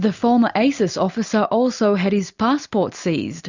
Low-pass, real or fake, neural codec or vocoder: 7.2 kHz; real; none